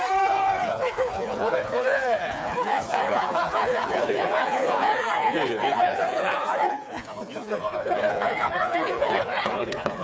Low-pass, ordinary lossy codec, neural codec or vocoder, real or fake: none; none; codec, 16 kHz, 4 kbps, FreqCodec, smaller model; fake